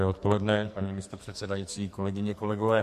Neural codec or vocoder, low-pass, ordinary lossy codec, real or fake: codec, 44.1 kHz, 2.6 kbps, SNAC; 14.4 kHz; MP3, 64 kbps; fake